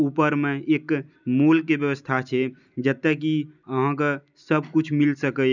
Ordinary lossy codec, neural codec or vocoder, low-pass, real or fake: none; none; 7.2 kHz; real